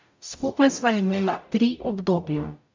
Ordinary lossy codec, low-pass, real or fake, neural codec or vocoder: MP3, 64 kbps; 7.2 kHz; fake; codec, 44.1 kHz, 0.9 kbps, DAC